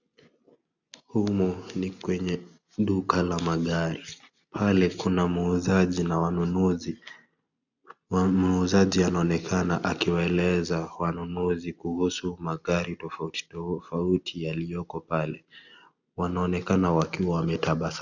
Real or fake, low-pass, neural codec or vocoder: real; 7.2 kHz; none